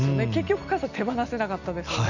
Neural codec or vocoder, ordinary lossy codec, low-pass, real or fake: none; none; 7.2 kHz; real